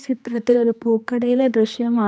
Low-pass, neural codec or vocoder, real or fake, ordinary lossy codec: none; codec, 16 kHz, 2 kbps, X-Codec, HuBERT features, trained on general audio; fake; none